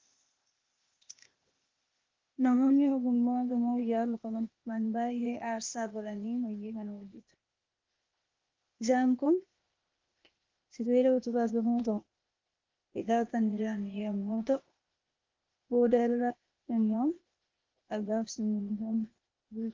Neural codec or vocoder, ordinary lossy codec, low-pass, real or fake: codec, 16 kHz, 0.8 kbps, ZipCodec; Opus, 32 kbps; 7.2 kHz; fake